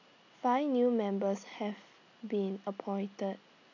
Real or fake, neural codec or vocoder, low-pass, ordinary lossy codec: real; none; 7.2 kHz; none